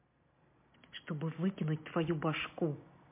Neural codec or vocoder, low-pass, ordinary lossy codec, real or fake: none; 3.6 kHz; MP3, 24 kbps; real